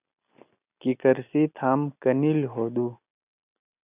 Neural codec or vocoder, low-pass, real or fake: none; 3.6 kHz; real